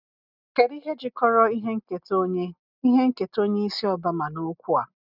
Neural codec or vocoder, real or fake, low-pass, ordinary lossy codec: none; real; 5.4 kHz; none